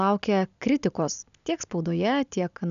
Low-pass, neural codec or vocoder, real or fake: 7.2 kHz; none; real